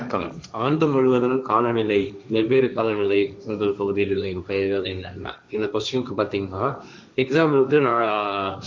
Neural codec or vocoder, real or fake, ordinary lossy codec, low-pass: codec, 16 kHz, 1.1 kbps, Voila-Tokenizer; fake; none; none